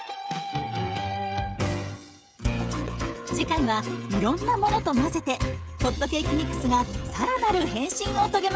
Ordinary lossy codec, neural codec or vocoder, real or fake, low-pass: none; codec, 16 kHz, 16 kbps, FreqCodec, smaller model; fake; none